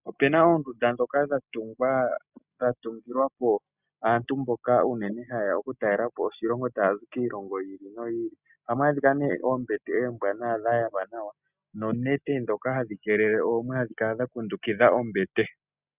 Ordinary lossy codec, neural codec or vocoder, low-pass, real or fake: Opus, 64 kbps; none; 3.6 kHz; real